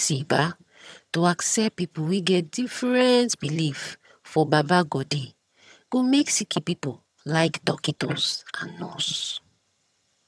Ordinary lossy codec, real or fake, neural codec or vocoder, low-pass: none; fake; vocoder, 22.05 kHz, 80 mel bands, HiFi-GAN; none